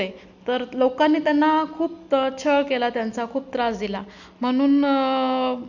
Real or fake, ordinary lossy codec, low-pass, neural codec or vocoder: real; none; 7.2 kHz; none